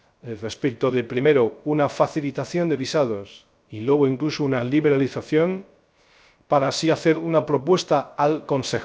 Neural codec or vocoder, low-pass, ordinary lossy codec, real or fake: codec, 16 kHz, 0.3 kbps, FocalCodec; none; none; fake